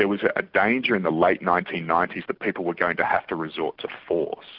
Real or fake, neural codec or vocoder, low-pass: real; none; 5.4 kHz